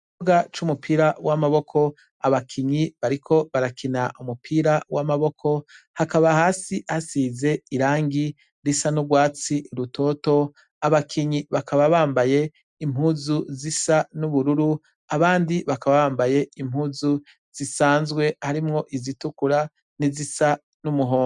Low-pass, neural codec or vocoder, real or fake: 10.8 kHz; none; real